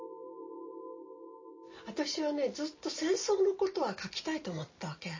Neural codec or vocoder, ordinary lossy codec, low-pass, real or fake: none; MP3, 32 kbps; 7.2 kHz; real